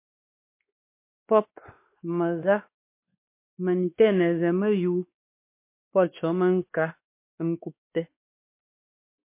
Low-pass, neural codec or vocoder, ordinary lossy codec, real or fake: 3.6 kHz; codec, 16 kHz, 2 kbps, X-Codec, WavLM features, trained on Multilingual LibriSpeech; MP3, 24 kbps; fake